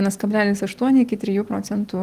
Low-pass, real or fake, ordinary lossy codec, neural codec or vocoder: 14.4 kHz; real; Opus, 24 kbps; none